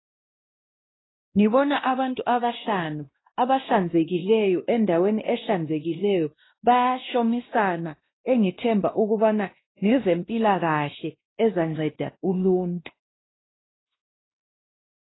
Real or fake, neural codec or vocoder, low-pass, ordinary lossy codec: fake; codec, 16 kHz, 1 kbps, X-Codec, WavLM features, trained on Multilingual LibriSpeech; 7.2 kHz; AAC, 16 kbps